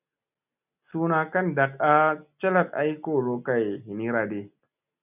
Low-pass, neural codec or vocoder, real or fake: 3.6 kHz; none; real